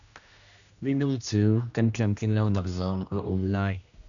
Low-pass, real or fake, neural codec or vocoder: 7.2 kHz; fake; codec, 16 kHz, 1 kbps, X-Codec, HuBERT features, trained on general audio